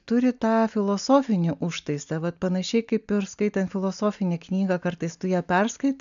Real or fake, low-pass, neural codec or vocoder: real; 7.2 kHz; none